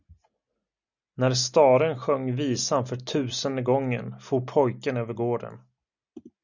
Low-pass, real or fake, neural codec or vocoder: 7.2 kHz; real; none